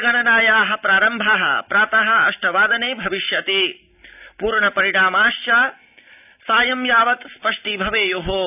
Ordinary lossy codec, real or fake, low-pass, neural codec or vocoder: none; real; 3.6 kHz; none